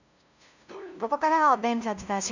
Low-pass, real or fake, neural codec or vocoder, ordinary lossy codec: 7.2 kHz; fake; codec, 16 kHz, 0.5 kbps, FunCodec, trained on LibriTTS, 25 frames a second; none